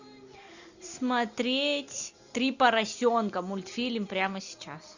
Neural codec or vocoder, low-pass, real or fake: none; 7.2 kHz; real